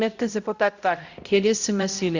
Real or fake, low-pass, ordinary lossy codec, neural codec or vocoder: fake; 7.2 kHz; Opus, 64 kbps; codec, 16 kHz, 0.5 kbps, X-Codec, HuBERT features, trained on balanced general audio